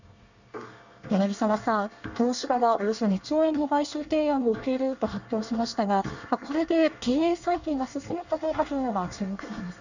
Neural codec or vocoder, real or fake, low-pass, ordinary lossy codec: codec, 24 kHz, 1 kbps, SNAC; fake; 7.2 kHz; none